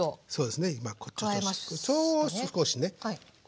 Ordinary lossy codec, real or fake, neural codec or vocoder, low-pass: none; real; none; none